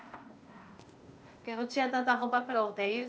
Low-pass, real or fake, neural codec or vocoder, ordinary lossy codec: none; fake; codec, 16 kHz, 0.8 kbps, ZipCodec; none